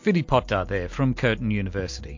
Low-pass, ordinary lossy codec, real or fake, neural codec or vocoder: 7.2 kHz; MP3, 48 kbps; real; none